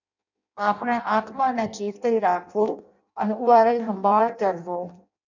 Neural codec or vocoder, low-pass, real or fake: codec, 16 kHz in and 24 kHz out, 0.6 kbps, FireRedTTS-2 codec; 7.2 kHz; fake